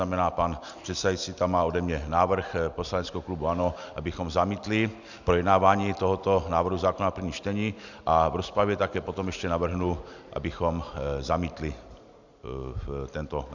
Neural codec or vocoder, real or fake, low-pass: none; real; 7.2 kHz